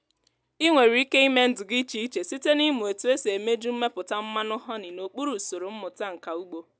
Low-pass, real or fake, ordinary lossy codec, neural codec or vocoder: none; real; none; none